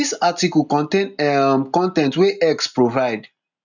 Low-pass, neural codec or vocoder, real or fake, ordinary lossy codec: 7.2 kHz; none; real; none